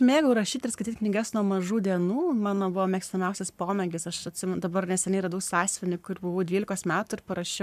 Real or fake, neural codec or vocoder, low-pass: fake; codec, 44.1 kHz, 7.8 kbps, Pupu-Codec; 14.4 kHz